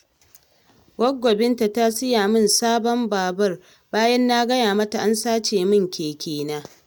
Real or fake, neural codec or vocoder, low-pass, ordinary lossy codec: real; none; none; none